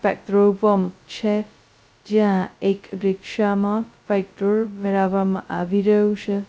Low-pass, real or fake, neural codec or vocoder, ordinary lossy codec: none; fake; codec, 16 kHz, 0.2 kbps, FocalCodec; none